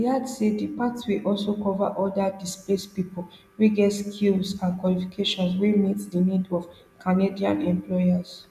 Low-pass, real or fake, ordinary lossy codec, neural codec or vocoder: 14.4 kHz; real; none; none